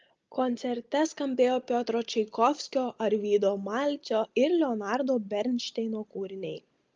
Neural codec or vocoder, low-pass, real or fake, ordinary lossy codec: none; 7.2 kHz; real; Opus, 24 kbps